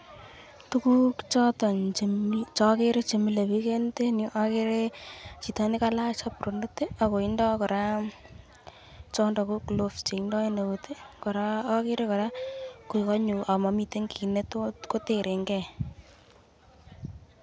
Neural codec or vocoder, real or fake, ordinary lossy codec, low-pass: none; real; none; none